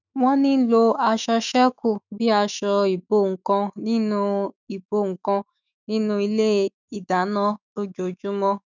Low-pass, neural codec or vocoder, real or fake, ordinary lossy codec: 7.2 kHz; autoencoder, 48 kHz, 128 numbers a frame, DAC-VAE, trained on Japanese speech; fake; none